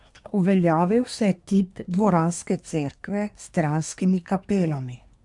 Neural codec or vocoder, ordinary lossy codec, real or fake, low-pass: codec, 24 kHz, 1 kbps, SNAC; none; fake; 10.8 kHz